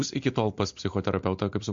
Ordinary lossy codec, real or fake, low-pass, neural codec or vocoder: MP3, 48 kbps; real; 7.2 kHz; none